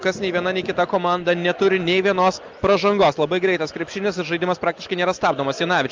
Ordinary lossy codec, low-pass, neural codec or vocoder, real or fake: Opus, 24 kbps; 7.2 kHz; none; real